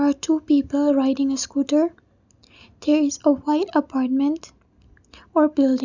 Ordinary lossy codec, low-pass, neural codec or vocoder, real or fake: none; 7.2 kHz; none; real